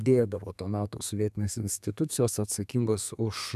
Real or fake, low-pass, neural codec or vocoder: fake; 14.4 kHz; codec, 32 kHz, 1.9 kbps, SNAC